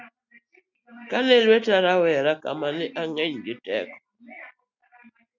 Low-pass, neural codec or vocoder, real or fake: 7.2 kHz; none; real